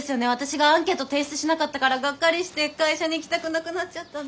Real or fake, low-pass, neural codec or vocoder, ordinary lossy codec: real; none; none; none